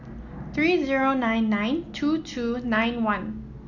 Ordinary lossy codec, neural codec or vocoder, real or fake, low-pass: none; none; real; 7.2 kHz